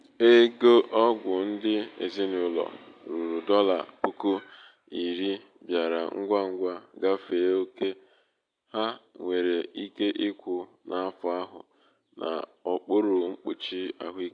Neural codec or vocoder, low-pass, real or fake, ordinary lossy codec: none; 9.9 kHz; real; AAC, 64 kbps